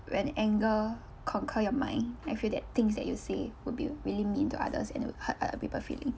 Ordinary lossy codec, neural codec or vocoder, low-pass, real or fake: none; none; none; real